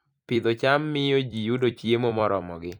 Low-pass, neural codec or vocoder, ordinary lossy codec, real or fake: 19.8 kHz; vocoder, 44.1 kHz, 128 mel bands every 256 samples, BigVGAN v2; none; fake